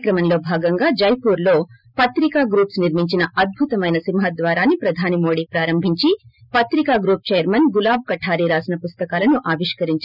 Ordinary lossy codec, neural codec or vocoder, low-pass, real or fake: none; none; 5.4 kHz; real